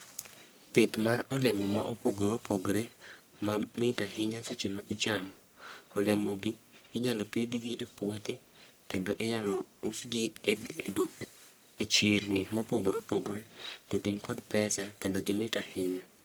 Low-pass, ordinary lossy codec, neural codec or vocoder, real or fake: none; none; codec, 44.1 kHz, 1.7 kbps, Pupu-Codec; fake